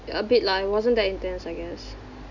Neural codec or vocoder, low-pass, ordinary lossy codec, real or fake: none; 7.2 kHz; none; real